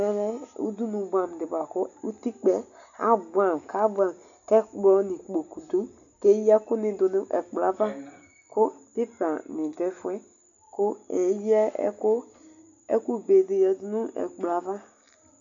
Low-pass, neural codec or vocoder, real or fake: 7.2 kHz; none; real